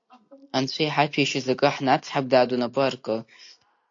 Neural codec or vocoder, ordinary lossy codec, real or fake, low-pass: none; AAC, 48 kbps; real; 7.2 kHz